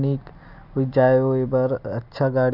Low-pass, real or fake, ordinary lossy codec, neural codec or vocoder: 5.4 kHz; real; none; none